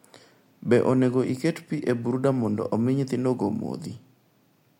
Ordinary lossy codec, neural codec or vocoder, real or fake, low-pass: MP3, 64 kbps; none; real; 19.8 kHz